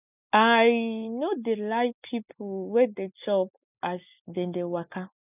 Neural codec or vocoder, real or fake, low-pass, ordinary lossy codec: none; real; 3.6 kHz; none